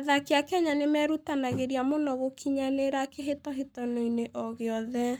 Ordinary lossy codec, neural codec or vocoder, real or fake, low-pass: none; codec, 44.1 kHz, 7.8 kbps, Pupu-Codec; fake; none